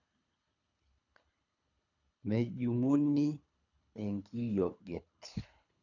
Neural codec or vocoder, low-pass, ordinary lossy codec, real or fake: codec, 24 kHz, 3 kbps, HILCodec; 7.2 kHz; none; fake